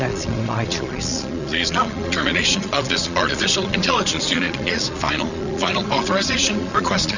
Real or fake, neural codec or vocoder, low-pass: fake; vocoder, 22.05 kHz, 80 mel bands, Vocos; 7.2 kHz